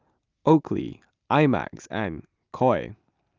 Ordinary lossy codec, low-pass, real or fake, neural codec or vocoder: Opus, 24 kbps; 7.2 kHz; real; none